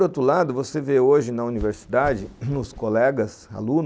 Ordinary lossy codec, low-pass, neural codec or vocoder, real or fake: none; none; none; real